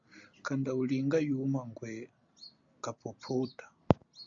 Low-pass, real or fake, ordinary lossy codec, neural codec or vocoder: 7.2 kHz; real; Opus, 64 kbps; none